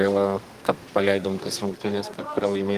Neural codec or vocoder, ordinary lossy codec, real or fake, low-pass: codec, 32 kHz, 1.9 kbps, SNAC; Opus, 24 kbps; fake; 14.4 kHz